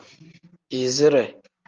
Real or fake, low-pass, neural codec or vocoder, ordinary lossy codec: real; 7.2 kHz; none; Opus, 32 kbps